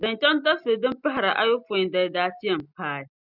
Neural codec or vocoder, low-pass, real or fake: none; 5.4 kHz; real